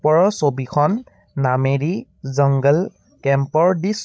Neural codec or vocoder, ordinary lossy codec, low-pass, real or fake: codec, 16 kHz, 16 kbps, FreqCodec, larger model; none; none; fake